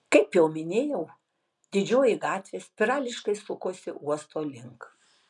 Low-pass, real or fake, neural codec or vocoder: 10.8 kHz; real; none